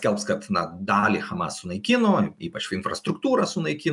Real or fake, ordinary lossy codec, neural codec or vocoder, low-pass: real; MP3, 96 kbps; none; 10.8 kHz